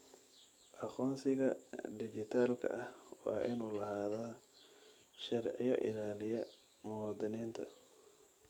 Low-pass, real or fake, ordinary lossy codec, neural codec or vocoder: 19.8 kHz; fake; MP3, 96 kbps; codec, 44.1 kHz, 7.8 kbps, DAC